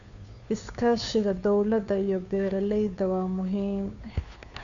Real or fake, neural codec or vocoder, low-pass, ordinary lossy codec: fake; codec, 16 kHz, 2 kbps, FunCodec, trained on Chinese and English, 25 frames a second; 7.2 kHz; none